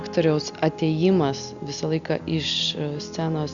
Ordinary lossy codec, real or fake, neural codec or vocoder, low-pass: Opus, 64 kbps; real; none; 7.2 kHz